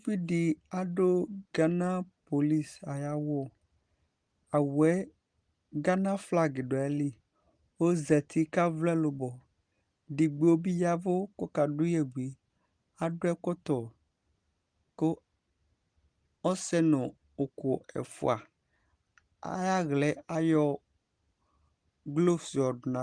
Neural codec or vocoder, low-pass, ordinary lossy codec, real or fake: none; 9.9 kHz; Opus, 24 kbps; real